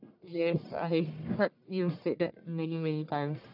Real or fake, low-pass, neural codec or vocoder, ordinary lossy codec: fake; 5.4 kHz; codec, 44.1 kHz, 1.7 kbps, Pupu-Codec; none